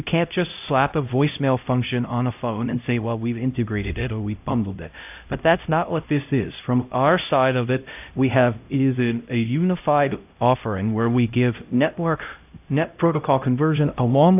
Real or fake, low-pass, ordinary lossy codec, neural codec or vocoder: fake; 3.6 kHz; AAC, 32 kbps; codec, 16 kHz, 0.5 kbps, X-Codec, HuBERT features, trained on LibriSpeech